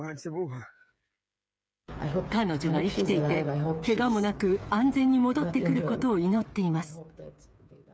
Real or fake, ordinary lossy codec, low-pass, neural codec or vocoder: fake; none; none; codec, 16 kHz, 8 kbps, FreqCodec, smaller model